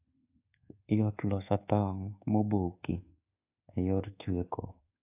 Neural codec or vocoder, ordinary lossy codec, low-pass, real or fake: codec, 24 kHz, 1.2 kbps, DualCodec; AAC, 32 kbps; 3.6 kHz; fake